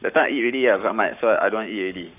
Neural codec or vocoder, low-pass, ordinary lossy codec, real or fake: codec, 44.1 kHz, 7.8 kbps, Pupu-Codec; 3.6 kHz; none; fake